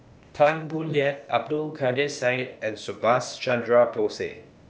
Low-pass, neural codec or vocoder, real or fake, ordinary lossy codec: none; codec, 16 kHz, 0.8 kbps, ZipCodec; fake; none